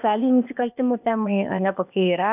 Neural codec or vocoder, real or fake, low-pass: codec, 16 kHz, 0.8 kbps, ZipCodec; fake; 3.6 kHz